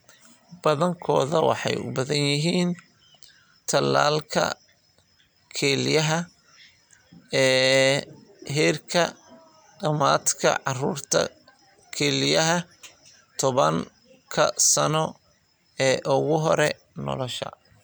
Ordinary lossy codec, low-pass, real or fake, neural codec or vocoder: none; none; real; none